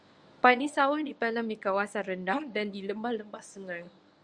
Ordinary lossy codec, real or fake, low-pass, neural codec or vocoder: Opus, 64 kbps; fake; 9.9 kHz; codec, 24 kHz, 0.9 kbps, WavTokenizer, medium speech release version 1